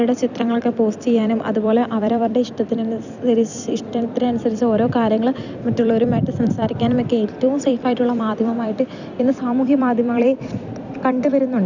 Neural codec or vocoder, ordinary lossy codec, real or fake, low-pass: vocoder, 44.1 kHz, 128 mel bands every 256 samples, BigVGAN v2; none; fake; 7.2 kHz